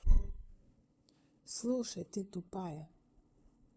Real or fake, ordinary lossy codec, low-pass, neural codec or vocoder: fake; none; none; codec, 16 kHz, 2 kbps, FunCodec, trained on LibriTTS, 25 frames a second